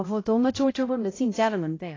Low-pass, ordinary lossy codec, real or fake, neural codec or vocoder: 7.2 kHz; AAC, 32 kbps; fake; codec, 16 kHz, 0.5 kbps, X-Codec, HuBERT features, trained on balanced general audio